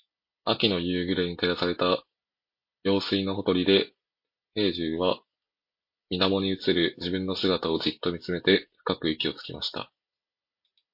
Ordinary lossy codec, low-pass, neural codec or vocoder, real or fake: MP3, 32 kbps; 5.4 kHz; none; real